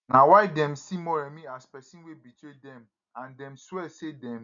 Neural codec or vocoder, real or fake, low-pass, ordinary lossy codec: none; real; 7.2 kHz; none